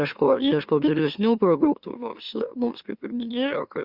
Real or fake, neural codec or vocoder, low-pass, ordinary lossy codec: fake; autoencoder, 44.1 kHz, a latent of 192 numbers a frame, MeloTTS; 5.4 kHz; Opus, 64 kbps